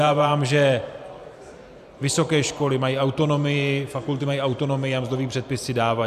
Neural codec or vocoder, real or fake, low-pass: vocoder, 44.1 kHz, 128 mel bands every 512 samples, BigVGAN v2; fake; 14.4 kHz